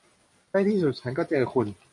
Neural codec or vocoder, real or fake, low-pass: none; real; 10.8 kHz